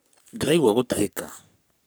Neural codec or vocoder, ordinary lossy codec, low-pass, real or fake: codec, 44.1 kHz, 3.4 kbps, Pupu-Codec; none; none; fake